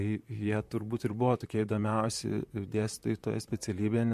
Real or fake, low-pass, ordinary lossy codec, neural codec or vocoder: fake; 14.4 kHz; MP3, 64 kbps; vocoder, 44.1 kHz, 128 mel bands, Pupu-Vocoder